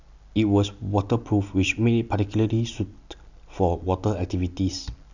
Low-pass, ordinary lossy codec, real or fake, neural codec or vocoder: 7.2 kHz; none; real; none